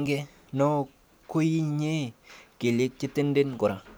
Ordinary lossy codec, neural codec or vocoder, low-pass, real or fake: none; none; none; real